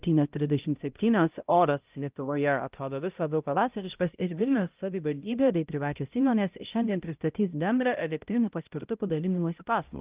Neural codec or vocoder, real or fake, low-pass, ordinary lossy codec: codec, 16 kHz, 0.5 kbps, X-Codec, HuBERT features, trained on balanced general audio; fake; 3.6 kHz; Opus, 24 kbps